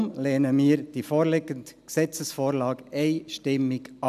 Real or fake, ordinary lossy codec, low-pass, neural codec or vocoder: real; none; 14.4 kHz; none